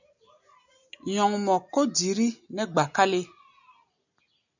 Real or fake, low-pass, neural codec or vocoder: real; 7.2 kHz; none